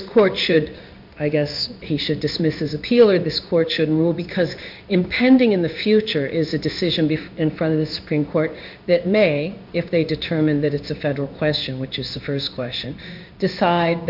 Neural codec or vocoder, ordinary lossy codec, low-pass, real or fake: codec, 16 kHz in and 24 kHz out, 1 kbps, XY-Tokenizer; MP3, 48 kbps; 5.4 kHz; fake